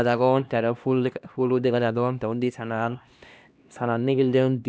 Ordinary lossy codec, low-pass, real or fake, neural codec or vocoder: none; none; fake; codec, 16 kHz, 1 kbps, X-Codec, HuBERT features, trained on LibriSpeech